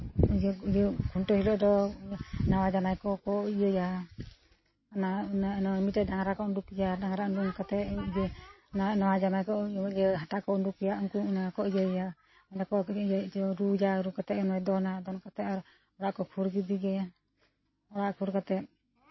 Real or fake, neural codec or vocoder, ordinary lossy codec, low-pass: real; none; MP3, 24 kbps; 7.2 kHz